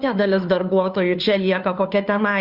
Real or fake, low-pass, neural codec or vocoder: fake; 5.4 kHz; codec, 16 kHz, 2 kbps, FunCodec, trained on Chinese and English, 25 frames a second